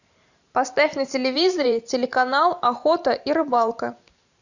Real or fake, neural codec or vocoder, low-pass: fake; vocoder, 44.1 kHz, 128 mel bands every 512 samples, BigVGAN v2; 7.2 kHz